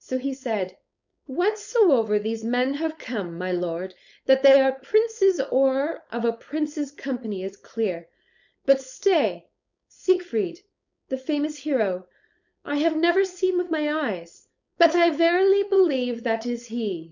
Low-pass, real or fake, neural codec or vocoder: 7.2 kHz; fake; codec, 16 kHz, 4.8 kbps, FACodec